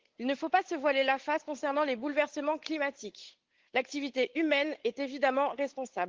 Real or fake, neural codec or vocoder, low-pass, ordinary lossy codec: fake; codec, 16 kHz, 8 kbps, FunCodec, trained on Chinese and English, 25 frames a second; 7.2 kHz; Opus, 16 kbps